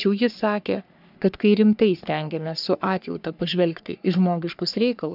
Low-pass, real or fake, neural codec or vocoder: 5.4 kHz; fake; codec, 44.1 kHz, 3.4 kbps, Pupu-Codec